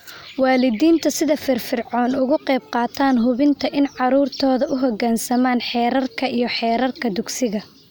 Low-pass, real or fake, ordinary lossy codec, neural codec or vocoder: none; real; none; none